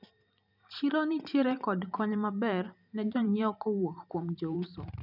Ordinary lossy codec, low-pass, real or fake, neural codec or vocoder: none; 5.4 kHz; fake; vocoder, 44.1 kHz, 128 mel bands every 512 samples, BigVGAN v2